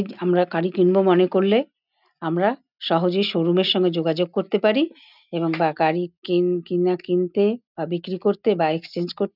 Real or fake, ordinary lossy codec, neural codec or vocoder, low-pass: real; none; none; 5.4 kHz